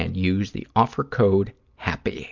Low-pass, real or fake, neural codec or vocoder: 7.2 kHz; real; none